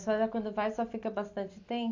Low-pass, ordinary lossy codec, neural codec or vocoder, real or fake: 7.2 kHz; AAC, 48 kbps; none; real